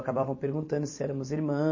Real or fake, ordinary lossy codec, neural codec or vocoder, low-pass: fake; MP3, 32 kbps; vocoder, 44.1 kHz, 128 mel bands every 256 samples, BigVGAN v2; 7.2 kHz